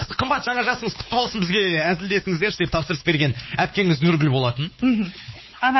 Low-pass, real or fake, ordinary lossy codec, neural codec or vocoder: 7.2 kHz; fake; MP3, 24 kbps; codec, 16 kHz, 4 kbps, X-Codec, WavLM features, trained on Multilingual LibriSpeech